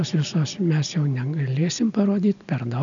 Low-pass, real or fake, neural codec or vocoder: 7.2 kHz; real; none